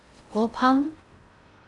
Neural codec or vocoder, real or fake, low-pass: codec, 16 kHz in and 24 kHz out, 0.6 kbps, FocalCodec, streaming, 4096 codes; fake; 10.8 kHz